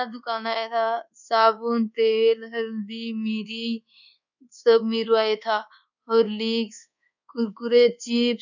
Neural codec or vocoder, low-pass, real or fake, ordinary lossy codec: codec, 24 kHz, 1.2 kbps, DualCodec; 7.2 kHz; fake; none